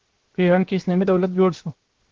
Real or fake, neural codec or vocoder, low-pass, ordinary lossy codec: fake; codec, 16 kHz, 0.7 kbps, FocalCodec; 7.2 kHz; Opus, 16 kbps